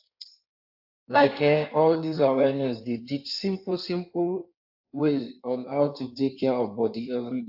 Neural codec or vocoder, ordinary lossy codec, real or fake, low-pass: codec, 16 kHz in and 24 kHz out, 1.1 kbps, FireRedTTS-2 codec; AAC, 48 kbps; fake; 5.4 kHz